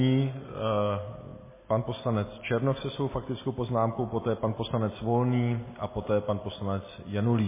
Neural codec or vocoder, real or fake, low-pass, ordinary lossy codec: none; real; 3.6 kHz; MP3, 16 kbps